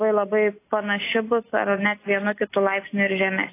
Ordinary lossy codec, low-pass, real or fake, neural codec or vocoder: AAC, 24 kbps; 3.6 kHz; real; none